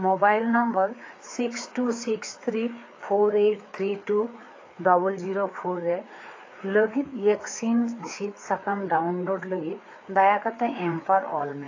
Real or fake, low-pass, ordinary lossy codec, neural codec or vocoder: fake; 7.2 kHz; AAC, 32 kbps; codec, 16 kHz, 4 kbps, FreqCodec, larger model